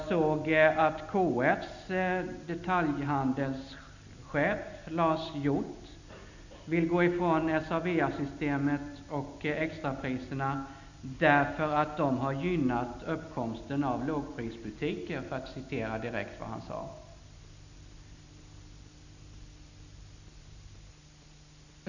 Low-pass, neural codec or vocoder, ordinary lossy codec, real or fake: 7.2 kHz; none; none; real